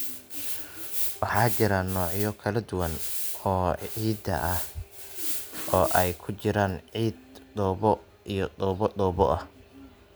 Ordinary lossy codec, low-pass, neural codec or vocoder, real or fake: none; none; none; real